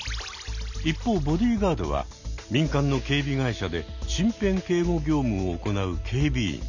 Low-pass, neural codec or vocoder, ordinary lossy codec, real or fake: 7.2 kHz; none; none; real